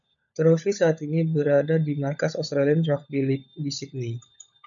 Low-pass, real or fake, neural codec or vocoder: 7.2 kHz; fake; codec, 16 kHz, 16 kbps, FunCodec, trained on LibriTTS, 50 frames a second